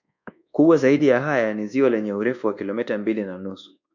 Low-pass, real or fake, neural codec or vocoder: 7.2 kHz; fake; codec, 24 kHz, 1.2 kbps, DualCodec